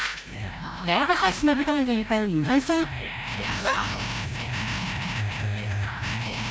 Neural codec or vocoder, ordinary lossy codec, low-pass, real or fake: codec, 16 kHz, 0.5 kbps, FreqCodec, larger model; none; none; fake